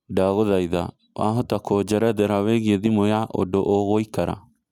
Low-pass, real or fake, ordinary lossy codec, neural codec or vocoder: 19.8 kHz; real; none; none